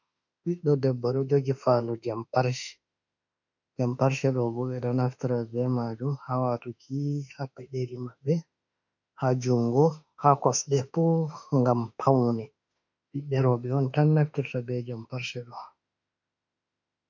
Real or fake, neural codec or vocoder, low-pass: fake; autoencoder, 48 kHz, 32 numbers a frame, DAC-VAE, trained on Japanese speech; 7.2 kHz